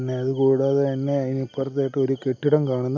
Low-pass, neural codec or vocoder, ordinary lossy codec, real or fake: 7.2 kHz; none; none; real